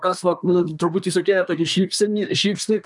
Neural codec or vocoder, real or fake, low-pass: codec, 24 kHz, 1 kbps, SNAC; fake; 10.8 kHz